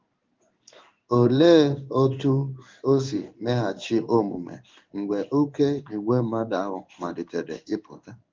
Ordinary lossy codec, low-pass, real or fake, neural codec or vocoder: Opus, 24 kbps; 7.2 kHz; fake; codec, 16 kHz in and 24 kHz out, 1 kbps, XY-Tokenizer